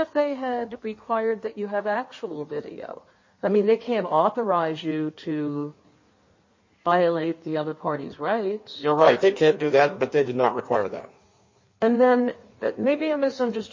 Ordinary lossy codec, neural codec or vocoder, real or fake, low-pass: MP3, 32 kbps; codec, 16 kHz in and 24 kHz out, 1.1 kbps, FireRedTTS-2 codec; fake; 7.2 kHz